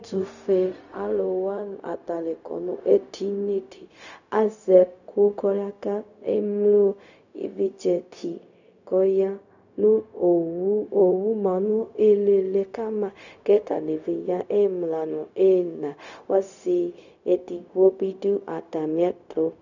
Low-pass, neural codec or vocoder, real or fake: 7.2 kHz; codec, 16 kHz, 0.4 kbps, LongCat-Audio-Codec; fake